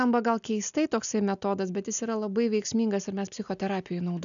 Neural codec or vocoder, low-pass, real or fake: none; 7.2 kHz; real